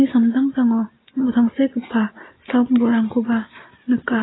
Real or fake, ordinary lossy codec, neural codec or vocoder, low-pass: real; AAC, 16 kbps; none; 7.2 kHz